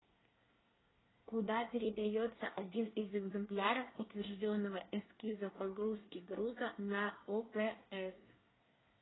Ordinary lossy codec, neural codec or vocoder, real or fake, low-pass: AAC, 16 kbps; codec, 24 kHz, 1 kbps, SNAC; fake; 7.2 kHz